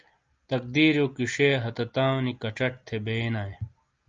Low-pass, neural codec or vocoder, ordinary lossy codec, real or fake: 7.2 kHz; none; Opus, 24 kbps; real